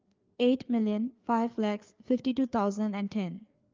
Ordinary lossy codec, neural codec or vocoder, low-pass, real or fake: Opus, 24 kbps; codec, 16 kHz, 4 kbps, FreqCodec, larger model; 7.2 kHz; fake